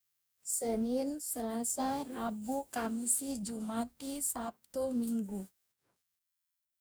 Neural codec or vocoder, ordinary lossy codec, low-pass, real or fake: codec, 44.1 kHz, 2.6 kbps, DAC; none; none; fake